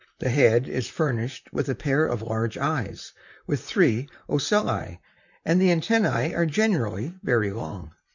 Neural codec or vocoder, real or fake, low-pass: vocoder, 44.1 kHz, 128 mel bands, Pupu-Vocoder; fake; 7.2 kHz